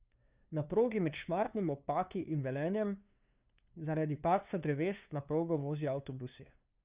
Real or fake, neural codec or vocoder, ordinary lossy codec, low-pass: fake; codec, 16 kHz, 2 kbps, FunCodec, trained on LibriTTS, 25 frames a second; Opus, 64 kbps; 3.6 kHz